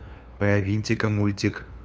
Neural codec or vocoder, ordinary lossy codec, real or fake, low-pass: codec, 16 kHz, 2 kbps, FunCodec, trained on LibriTTS, 25 frames a second; none; fake; none